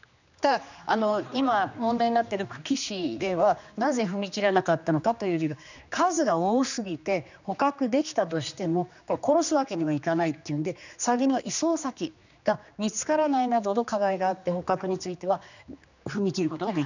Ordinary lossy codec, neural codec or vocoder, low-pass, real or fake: none; codec, 16 kHz, 2 kbps, X-Codec, HuBERT features, trained on general audio; 7.2 kHz; fake